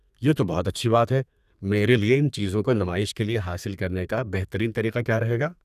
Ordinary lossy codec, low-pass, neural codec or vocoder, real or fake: none; 14.4 kHz; codec, 32 kHz, 1.9 kbps, SNAC; fake